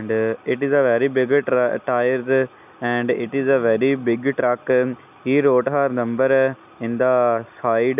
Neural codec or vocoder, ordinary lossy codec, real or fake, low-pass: none; none; real; 3.6 kHz